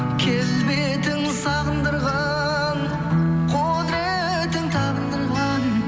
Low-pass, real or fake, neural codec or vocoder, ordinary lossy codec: none; real; none; none